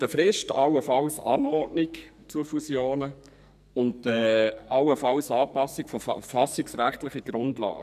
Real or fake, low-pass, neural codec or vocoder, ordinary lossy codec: fake; 14.4 kHz; codec, 44.1 kHz, 2.6 kbps, SNAC; none